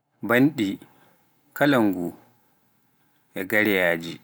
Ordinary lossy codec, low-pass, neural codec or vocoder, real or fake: none; none; none; real